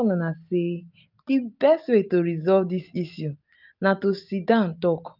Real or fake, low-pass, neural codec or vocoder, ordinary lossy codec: real; 5.4 kHz; none; none